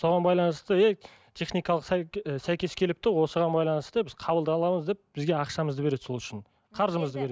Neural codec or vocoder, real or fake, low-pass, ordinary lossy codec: none; real; none; none